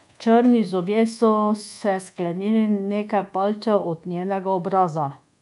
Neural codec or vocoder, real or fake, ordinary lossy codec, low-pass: codec, 24 kHz, 1.2 kbps, DualCodec; fake; none; 10.8 kHz